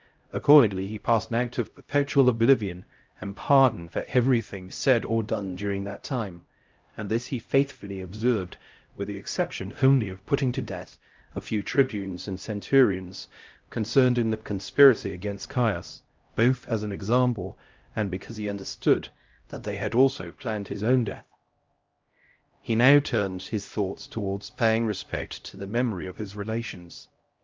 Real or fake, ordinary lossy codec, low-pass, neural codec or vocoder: fake; Opus, 24 kbps; 7.2 kHz; codec, 16 kHz, 0.5 kbps, X-Codec, HuBERT features, trained on LibriSpeech